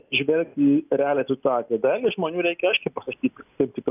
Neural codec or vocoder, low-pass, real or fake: none; 3.6 kHz; real